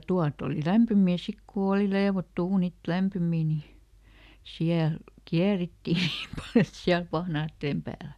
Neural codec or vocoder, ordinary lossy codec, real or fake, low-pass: none; none; real; 14.4 kHz